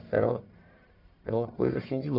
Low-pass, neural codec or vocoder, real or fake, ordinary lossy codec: 5.4 kHz; codec, 44.1 kHz, 1.7 kbps, Pupu-Codec; fake; none